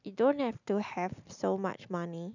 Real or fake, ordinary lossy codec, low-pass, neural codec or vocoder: real; none; 7.2 kHz; none